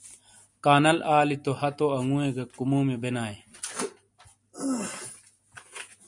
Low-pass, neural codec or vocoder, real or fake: 10.8 kHz; none; real